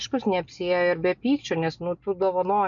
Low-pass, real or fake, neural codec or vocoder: 7.2 kHz; real; none